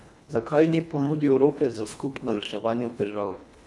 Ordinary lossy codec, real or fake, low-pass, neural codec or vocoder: none; fake; none; codec, 24 kHz, 1.5 kbps, HILCodec